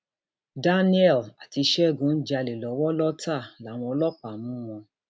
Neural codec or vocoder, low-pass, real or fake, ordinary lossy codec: none; none; real; none